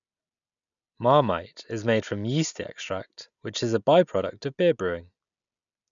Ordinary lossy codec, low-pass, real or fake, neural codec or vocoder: none; 7.2 kHz; real; none